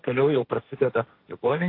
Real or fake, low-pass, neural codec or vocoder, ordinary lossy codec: fake; 5.4 kHz; codec, 16 kHz, 1.1 kbps, Voila-Tokenizer; AAC, 32 kbps